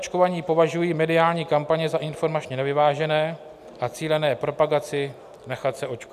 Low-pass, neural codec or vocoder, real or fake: 14.4 kHz; none; real